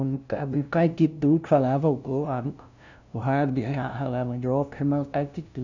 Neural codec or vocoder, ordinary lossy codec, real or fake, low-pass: codec, 16 kHz, 0.5 kbps, FunCodec, trained on LibriTTS, 25 frames a second; none; fake; 7.2 kHz